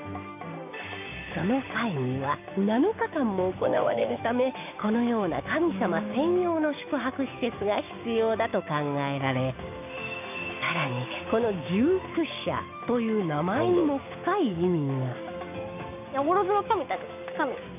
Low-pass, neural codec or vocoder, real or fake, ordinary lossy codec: 3.6 kHz; codec, 16 kHz, 6 kbps, DAC; fake; none